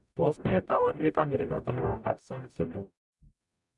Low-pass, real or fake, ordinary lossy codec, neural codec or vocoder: 10.8 kHz; fake; none; codec, 44.1 kHz, 0.9 kbps, DAC